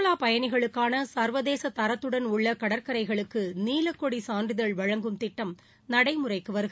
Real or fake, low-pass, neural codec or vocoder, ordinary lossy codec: real; none; none; none